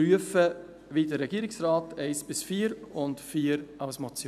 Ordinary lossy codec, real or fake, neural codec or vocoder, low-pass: none; real; none; 14.4 kHz